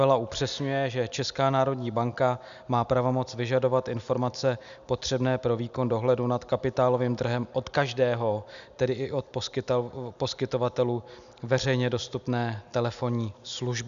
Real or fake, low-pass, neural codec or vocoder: real; 7.2 kHz; none